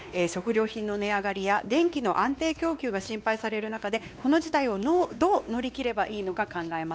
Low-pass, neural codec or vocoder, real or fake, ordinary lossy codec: none; codec, 16 kHz, 2 kbps, X-Codec, WavLM features, trained on Multilingual LibriSpeech; fake; none